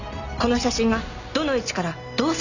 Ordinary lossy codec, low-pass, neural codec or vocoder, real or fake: none; 7.2 kHz; none; real